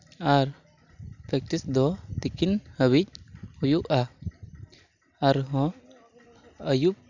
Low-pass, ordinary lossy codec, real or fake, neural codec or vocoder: 7.2 kHz; none; real; none